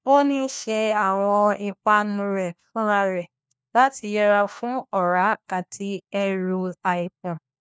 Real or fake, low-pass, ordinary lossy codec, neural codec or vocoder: fake; none; none; codec, 16 kHz, 1 kbps, FunCodec, trained on LibriTTS, 50 frames a second